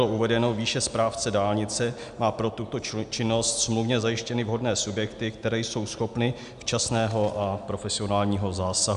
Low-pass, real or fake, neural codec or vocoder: 10.8 kHz; real; none